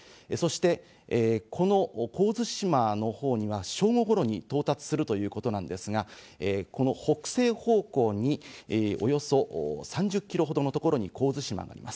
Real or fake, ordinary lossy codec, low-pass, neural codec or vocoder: real; none; none; none